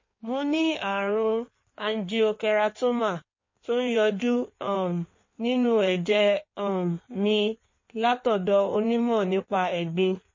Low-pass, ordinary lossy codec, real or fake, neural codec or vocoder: 7.2 kHz; MP3, 32 kbps; fake; codec, 16 kHz in and 24 kHz out, 1.1 kbps, FireRedTTS-2 codec